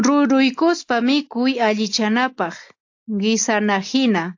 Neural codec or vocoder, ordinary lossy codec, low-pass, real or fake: none; AAC, 48 kbps; 7.2 kHz; real